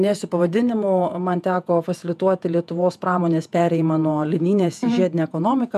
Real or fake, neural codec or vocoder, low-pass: fake; vocoder, 48 kHz, 128 mel bands, Vocos; 14.4 kHz